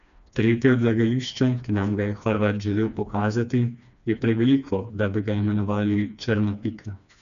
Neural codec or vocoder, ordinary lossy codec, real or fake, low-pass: codec, 16 kHz, 2 kbps, FreqCodec, smaller model; none; fake; 7.2 kHz